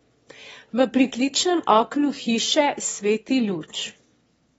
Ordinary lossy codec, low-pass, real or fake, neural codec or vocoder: AAC, 24 kbps; 9.9 kHz; fake; autoencoder, 22.05 kHz, a latent of 192 numbers a frame, VITS, trained on one speaker